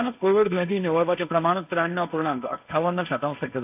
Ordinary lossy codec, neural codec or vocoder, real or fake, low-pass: AAC, 32 kbps; codec, 16 kHz, 1.1 kbps, Voila-Tokenizer; fake; 3.6 kHz